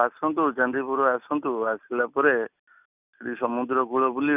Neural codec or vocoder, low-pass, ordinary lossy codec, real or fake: none; 3.6 kHz; none; real